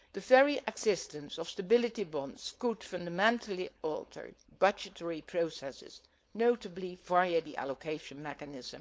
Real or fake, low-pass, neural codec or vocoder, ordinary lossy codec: fake; none; codec, 16 kHz, 4.8 kbps, FACodec; none